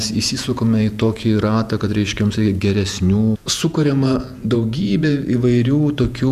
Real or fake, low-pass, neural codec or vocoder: fake; 14.4 kHz; vocoder, 48 kHz, 128 mel bands, Vocos